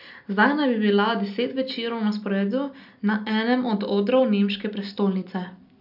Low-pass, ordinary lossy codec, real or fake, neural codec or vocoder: 5.4 kHz; none; real; none